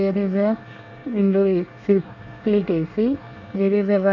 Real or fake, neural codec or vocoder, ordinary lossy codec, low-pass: fake; codec, 24 kHz, 1 kbps, SNAC; none; 7.2 kHz